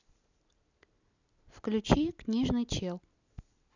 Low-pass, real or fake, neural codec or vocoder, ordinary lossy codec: 7.2 kHz; real; none; none